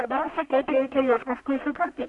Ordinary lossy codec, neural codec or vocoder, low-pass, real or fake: AAC, 64 kbps; codec, 44.1 kHz, 1.7 kbps, Pupu-Codec; 10.8 kHz; fake